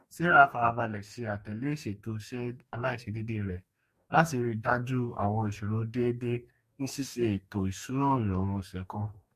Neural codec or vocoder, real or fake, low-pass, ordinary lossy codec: codec, 44.1 kHz, 2.6 kbps, DAC; fake; 14.4 kHz; MP3, 64 kbps